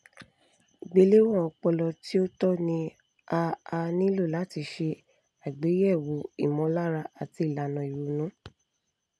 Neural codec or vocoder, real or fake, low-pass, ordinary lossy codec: none; real; none; none